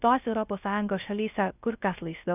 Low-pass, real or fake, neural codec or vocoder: 3.6 kHz; fake; codec, 16 kHz, 0.3 kbps, FocalCodec